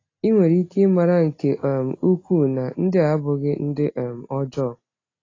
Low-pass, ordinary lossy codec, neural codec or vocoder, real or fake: 7.2 kHz; AAC, 32 kbps; none; real